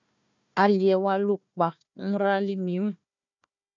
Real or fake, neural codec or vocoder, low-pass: fake; codec, 16 kHz, 1 kbps, FunCodec, trained on Chinese and English, 50 frames a second; 7.2 kHz